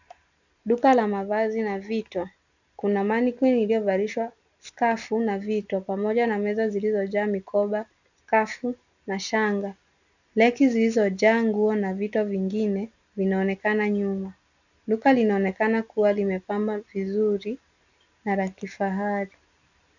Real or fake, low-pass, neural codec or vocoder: real; 7.2 kHz; none